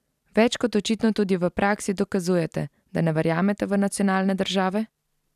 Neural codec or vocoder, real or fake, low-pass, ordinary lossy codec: none; real; 14.4 kHz; none